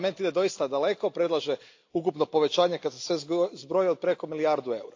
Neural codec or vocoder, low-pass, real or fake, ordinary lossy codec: none; 7.2 kHz; real; AAC, 48 kbps